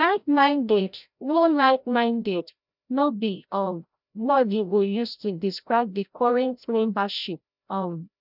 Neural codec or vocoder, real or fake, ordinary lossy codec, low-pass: codec, 16 kHz, 0.5 kbps, FreqCodec, larger model; fake; none; 5.4 kHz